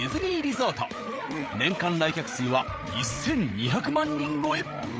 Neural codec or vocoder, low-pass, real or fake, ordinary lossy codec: codec, 16 kHz, 8 kbps, FreqCodec, larger model; none; fake; none